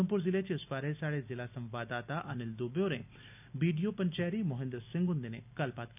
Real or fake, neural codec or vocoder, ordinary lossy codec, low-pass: real; none; none; 3.6 kHz